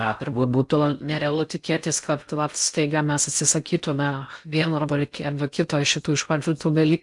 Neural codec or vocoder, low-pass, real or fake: codec, 16 kHz in and 24 kHz out, 0.6 kbps, FocalCodec, streaming, 4096 codes; 10.8 kHz; fake